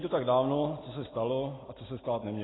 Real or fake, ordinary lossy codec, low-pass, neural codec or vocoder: real; AAC, 16 kbps; 7.2 kHz; none